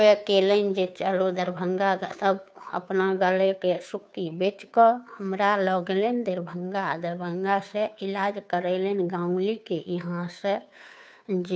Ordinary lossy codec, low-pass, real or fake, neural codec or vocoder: none; none; fake; codec, 16 kHz, 2 kbps, FunCodec, trained on Chinese and English, 25 frames a second